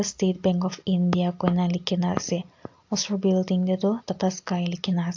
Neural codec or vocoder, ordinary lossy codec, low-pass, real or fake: none; AAC, 48 kbps; 7.2 kHz; real